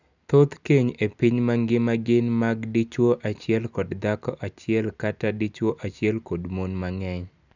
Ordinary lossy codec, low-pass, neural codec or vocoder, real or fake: none; 7.2 kHz; none; real